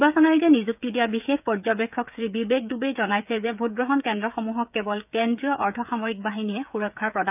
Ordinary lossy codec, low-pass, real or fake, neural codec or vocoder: none; 3.6 kHz; fake; codec, 16 kHz, 16 kbps, FreqCodec, smaller model